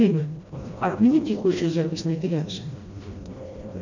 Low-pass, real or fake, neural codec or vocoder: 7.2 kHz; fake; codec, 16 kHz, 1 kbps, FreqCodec, smaller model